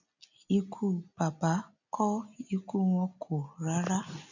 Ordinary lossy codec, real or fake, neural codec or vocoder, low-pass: none; real; none; 7.2 kHz